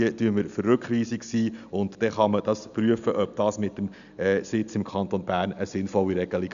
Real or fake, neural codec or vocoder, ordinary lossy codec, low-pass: real; none; none; 7.2 kHz